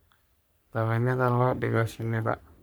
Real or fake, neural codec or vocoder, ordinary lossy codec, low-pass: fake; codec, 44.1 kHz, 3.4 kbps, Pupu-Codec; none; none